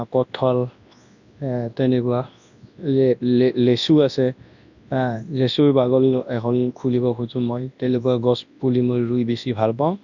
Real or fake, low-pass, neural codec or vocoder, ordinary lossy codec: fake; 7.2 kHz; codec, 24 kHz, 0.9 kbps, WavTokenizer, large speech release; none